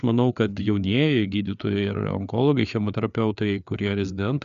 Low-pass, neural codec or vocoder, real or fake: 7.2 kHz; codec, 16 kHz, 4 kbps, FreqCodec, larger model; fake